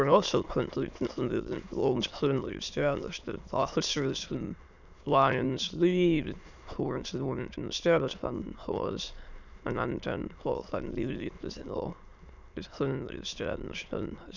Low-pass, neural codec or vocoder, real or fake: 7.2 kHz; autoencoder, 22.05 kHz, a latent of 192 numbers a frame, VITS, trained on many speakers; fake